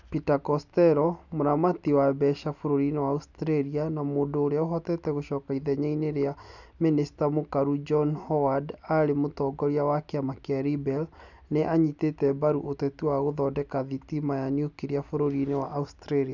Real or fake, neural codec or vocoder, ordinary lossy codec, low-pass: real; none; none; 7.2 kHz